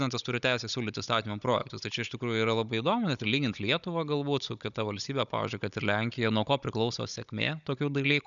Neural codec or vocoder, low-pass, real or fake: codec, 16 kHz, 16 kbps, FunCodec, trained on Chinese and English, 50 frames a second; 7.2 kHz; fake